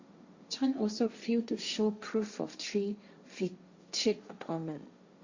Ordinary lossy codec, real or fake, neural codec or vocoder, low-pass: Opus, 64 kbps; fake; codec, 16 kHz, 1.1 kbps, Voila-Tokenizer; 7.2 kHz